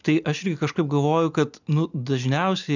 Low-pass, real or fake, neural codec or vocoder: 7.2 kHz; real; none